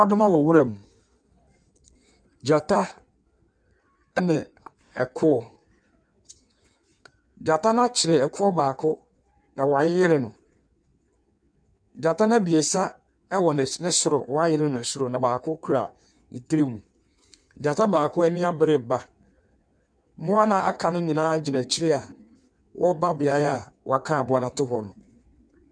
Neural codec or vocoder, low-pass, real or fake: codec, 16 kHz in and 24 kHz out, 1.1 kbps, FireRedTTS-2 codec; 9.9 kHz; fake